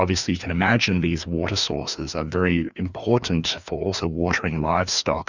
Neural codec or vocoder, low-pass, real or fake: codec, 16 kHz, 2 kbps, FreqCodec, larger model; 7.2 kHz; fake